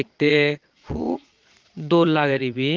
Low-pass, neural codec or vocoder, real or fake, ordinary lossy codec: 7.2 kHz; vocoder, 22.05 kHz, 80 mel bands, Vocos; fake; Opus, 32 kbps